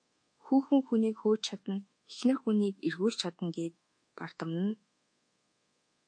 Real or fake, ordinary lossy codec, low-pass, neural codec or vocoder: fake; MP3, 48 kbps; 9.9 kHz; codec, 24 kHz, 1.2 kbps, DualCodec